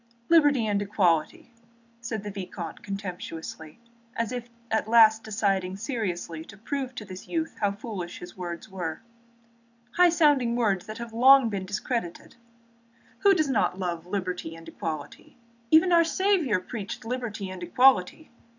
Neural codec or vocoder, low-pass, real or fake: none; 7.2 kHz; real